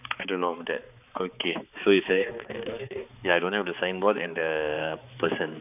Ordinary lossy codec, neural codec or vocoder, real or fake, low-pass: none; codec, 16 kHz, 4 kbps, X-Codec, HuBERT features, trained on balanced general audio; fake; 3.6 kHz